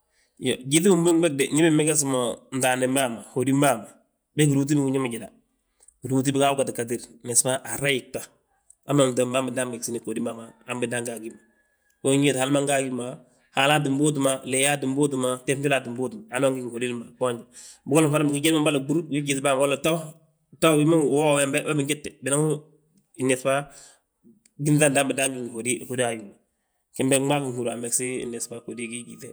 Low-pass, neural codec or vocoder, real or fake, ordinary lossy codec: none; vocoder, 48 kHz, 128 mel bands, Vocos; fake; none